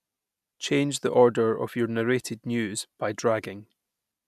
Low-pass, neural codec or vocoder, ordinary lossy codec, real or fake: 14.4 kHz; none; none; real